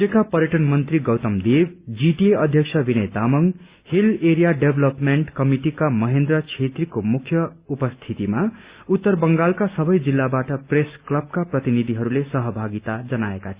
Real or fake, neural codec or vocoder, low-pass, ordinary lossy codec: real; none; 3.6 kHz; Opus, 64 kbps